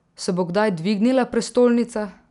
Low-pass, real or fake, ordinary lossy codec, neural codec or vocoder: 10.8 kHz; real; none; none